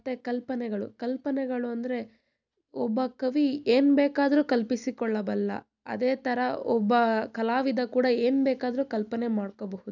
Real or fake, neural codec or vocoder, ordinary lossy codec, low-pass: real; none; none; 7.2 kHz